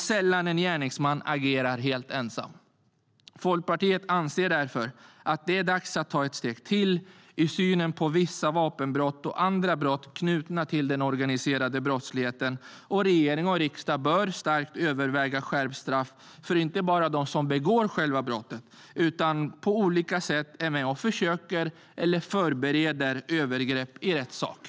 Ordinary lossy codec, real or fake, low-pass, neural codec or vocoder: none; real; none; none